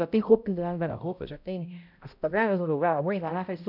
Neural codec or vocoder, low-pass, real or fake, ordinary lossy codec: codec, 16 kHz, 0.5 kbps, X-Codec, HuBERT features, trained on balanced general audio; 5.4 kHz; fake; none